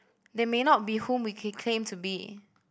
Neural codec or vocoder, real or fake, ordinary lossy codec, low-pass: none; real; none; none